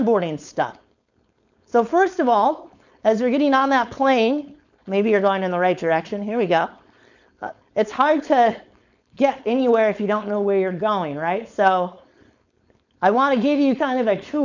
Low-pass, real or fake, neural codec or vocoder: 7.2 kHz; fake; codec, 16 kHz, 4.8 kbps, FACodec